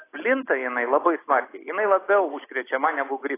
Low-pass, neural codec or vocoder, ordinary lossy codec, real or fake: 3.6 kHz; none; AAC, 16 kbps; real